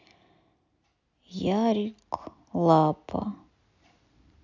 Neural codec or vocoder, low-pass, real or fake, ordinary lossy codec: none; 7.2 kHz; real; none